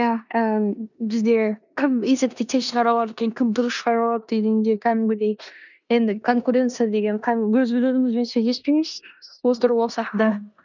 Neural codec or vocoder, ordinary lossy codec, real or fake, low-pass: codec, 16 kHz in and 24 kHz out, 0.9 kbps, LongCat-Audio-Codec, four codebook decoder; none; fake; 7.2 kHz